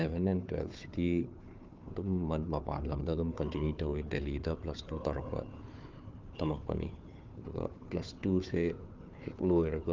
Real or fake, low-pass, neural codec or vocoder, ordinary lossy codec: fake; 7.2 kHz; codec, 16 kHz, 4 kbps, FunCodec, trained on Chinese and English, 50 frames a second; Opus, 32 kbps